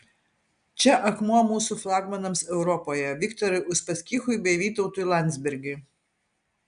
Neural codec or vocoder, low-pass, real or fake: none; 9.9 kHz; real